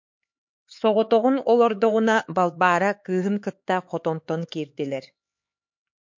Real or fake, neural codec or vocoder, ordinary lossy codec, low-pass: fake; codec, 16 kHz, 4 kbps, X-Codec, HuBERT features, trained on LibriSpeech; MP3, 48 kbps; 7.2 kHz